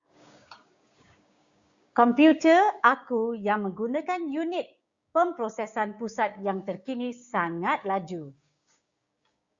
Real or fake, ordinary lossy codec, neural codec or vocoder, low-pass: fake; Opus, 64 kbps; codec, 16 kHz, 6 kbps, DAC; 7.2 kHz